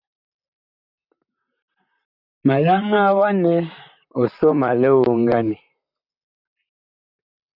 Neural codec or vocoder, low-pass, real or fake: vocoder, 44.1 kHz, 128 mel bands, Pupu-Vocoder; 5.4 kHz; fake